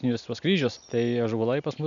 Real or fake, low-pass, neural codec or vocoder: real; 7.2 kHz; none